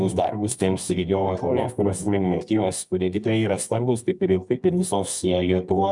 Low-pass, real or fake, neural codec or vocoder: 10.8 kHz; fake; codec, 24 kHz, 0.9 kbps, WavTokenizer, medium music audio release